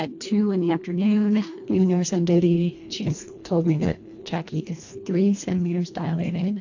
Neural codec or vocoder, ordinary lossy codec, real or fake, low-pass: codec, 24 kHz, 1.5 kbps, HILCodec; AAC, 48 kbps; fake; 7.2 kHz